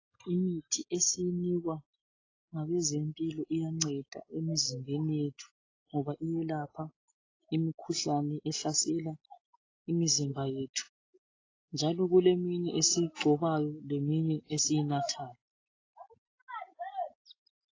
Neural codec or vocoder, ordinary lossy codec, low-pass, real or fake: none; AAC, 32 kbps; 7.2 kHz; real